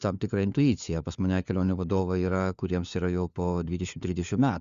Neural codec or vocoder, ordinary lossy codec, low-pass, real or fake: codec, 16 kHz, 4 kbps, FunCodec, trained on LibriTTS, 50 frames a second; Opus, 64 kbps; 7.2 kHz; fake